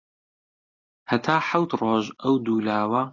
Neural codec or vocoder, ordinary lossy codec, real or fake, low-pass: none; AAC, 48 kbps; real; 7.2 kHz